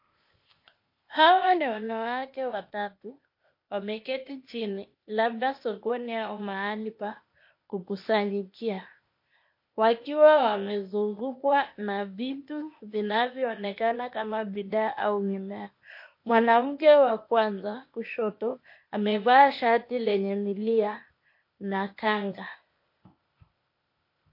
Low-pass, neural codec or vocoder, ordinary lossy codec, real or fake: 5.4 kHz; codec, 16 kHz, 0.8 kbps, ZipCodec; MP3, 32 kbps; fake